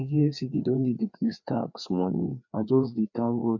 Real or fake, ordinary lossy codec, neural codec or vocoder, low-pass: fake; none; codec, 16 kHz, 2 kbps, FreqCodec, larger model; 7.2 kHz